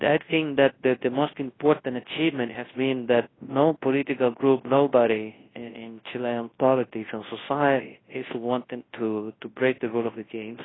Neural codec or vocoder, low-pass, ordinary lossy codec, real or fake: codec, 24 kHz, 0.9 kbps, WavTokenizer, large speech release; 7.2 kHz; AAC, 16 kbps; fake